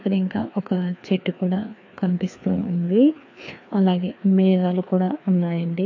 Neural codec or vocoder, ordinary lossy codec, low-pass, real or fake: codec, 16 kHz, 2 kbps, FreqCodec, larger model; AAC, 48 kbps; 7.2 kHz; fake